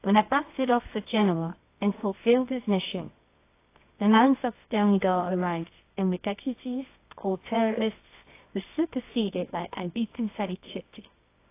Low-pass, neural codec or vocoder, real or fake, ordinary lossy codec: 3.6 kHz; codec, 24 kHz, 0.9 kbps, WavTokenizer, medium music audio release; fake; AAC, 24 kbps